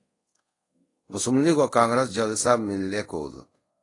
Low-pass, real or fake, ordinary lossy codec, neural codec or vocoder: 10.8 kHz; fake; AAC, 32 kbps; codec, 24 kHz, 0.5 kbps, DualCodec